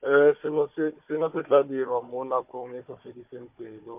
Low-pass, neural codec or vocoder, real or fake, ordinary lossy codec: 3.6 kHz; codec, 16 kHz, 4 kbps, FunCodec, trained on Chinese and English, 50 frames a second; fake; MP3, 32 kbps